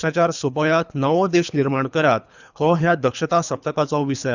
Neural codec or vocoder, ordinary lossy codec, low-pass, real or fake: codec, 24 kHz, 3 kbps, HILCodec; none; 7.2 kHz; fake